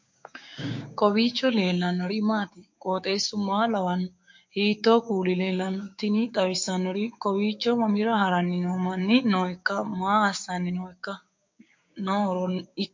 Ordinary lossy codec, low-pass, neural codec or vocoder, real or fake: MP3, 48 kbps; 7.2 kHz; codec, 16 kHz, 6 kbps, DAC; fake